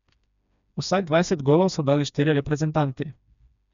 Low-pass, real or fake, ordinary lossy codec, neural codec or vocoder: 7.2 kHz; fake; none; codec, 16 kHz, 2 kbps, FreqCodec, smaller model